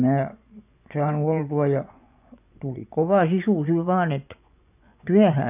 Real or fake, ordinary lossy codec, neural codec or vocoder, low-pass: fake; none; vocoder, 24 kHz, 100 mel bands, Vocos; 3.6 kHz